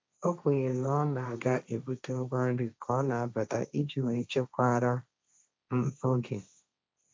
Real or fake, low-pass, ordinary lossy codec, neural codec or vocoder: fake; none; none; codec, 16 kHz, 1.1 kbps, Voila-Tokenizer